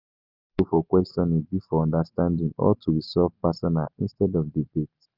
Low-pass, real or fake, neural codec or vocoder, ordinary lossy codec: 5.4 kHz; real; none; Opus, 24 kbps